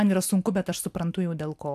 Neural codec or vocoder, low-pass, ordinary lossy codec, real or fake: none; 14.4 kHz; AAC, 96 kbps; real